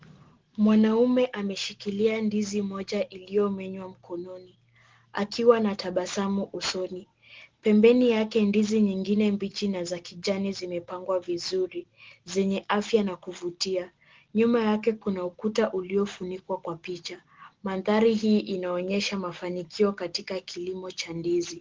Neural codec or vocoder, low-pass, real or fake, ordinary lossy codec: none; 7.2 kHz; real; Opus, 16 kbps